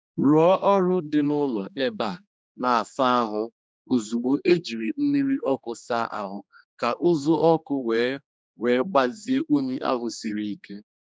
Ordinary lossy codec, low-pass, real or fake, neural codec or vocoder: none; none; fake; codec, 16 kHz, 2 kbps, X-Codec, HuBERT features, trained on general audio